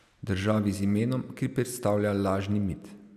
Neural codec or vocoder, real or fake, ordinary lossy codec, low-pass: none; real; none; 14.4 kHz